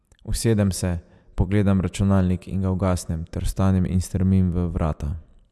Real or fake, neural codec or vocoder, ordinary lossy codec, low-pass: real; none; none; none